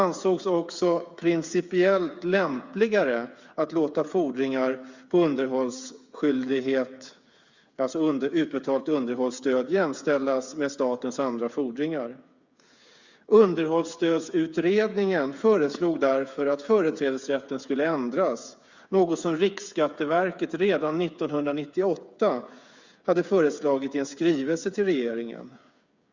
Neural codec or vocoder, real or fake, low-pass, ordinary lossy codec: codec, 16 kHz, 8 kbps, FreqCodec, smaller model; fake; 7.2 kHz; Opus, 64 kbps